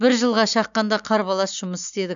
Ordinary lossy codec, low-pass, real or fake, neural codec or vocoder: none; 7.2 kHz; real; none